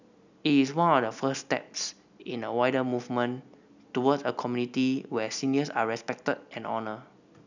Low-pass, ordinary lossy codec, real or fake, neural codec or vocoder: 7.2 kHz; none; real; none